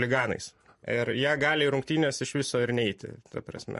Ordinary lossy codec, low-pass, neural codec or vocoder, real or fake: MP3, 48 kbps; 14.4 kHz; none; real